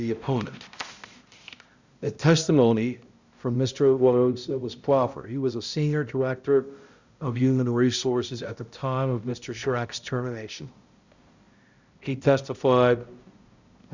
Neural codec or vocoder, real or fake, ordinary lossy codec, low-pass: codec, 16 kHz, 0.5 kbps, X-Codec, HuBERT features, trained on balanced general audio; fake; Opus, 64 kbps; 7.2 kHz